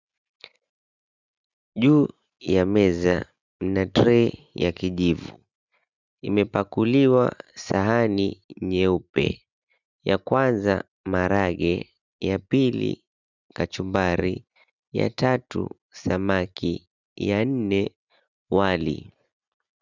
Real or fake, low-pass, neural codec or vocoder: real; 7.2 kHz; none